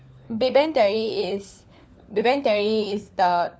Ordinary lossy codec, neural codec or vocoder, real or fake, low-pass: none; codec, 16 kHz, 4 kbps, FunCodec, trained on LibriTTS, 50 frames a second; fake; none